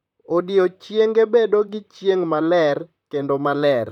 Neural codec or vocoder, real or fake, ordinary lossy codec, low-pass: vocoder, 44.1 kHz, 128 mel bands, Pupu-Vocoder; fake; none; 19.8 kHz